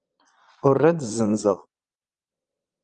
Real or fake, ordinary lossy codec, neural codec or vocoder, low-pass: fake; Opus, 24 kbps; vocoder, 22.05 kHz, 80 mel bands, Vocos; 9.9 kHz